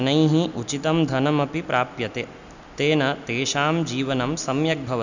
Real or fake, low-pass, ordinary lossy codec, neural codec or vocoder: real; 7.2 kHz; none; none